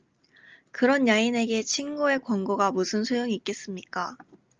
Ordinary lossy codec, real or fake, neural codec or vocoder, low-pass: Opus, 24 kbps; real; none; 7.2 kHz